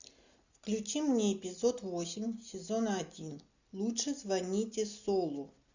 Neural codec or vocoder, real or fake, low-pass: none; real; 7.2 kHz